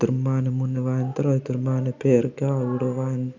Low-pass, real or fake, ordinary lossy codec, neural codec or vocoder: 7.2 kHz; real; none; none